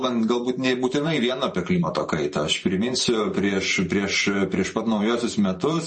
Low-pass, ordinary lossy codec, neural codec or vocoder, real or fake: 10.8 kHz; MP3, 32 kbps; vocoder, 44.1 kHz, 128 mel bands every 512 samples, BigVGAN v2; fake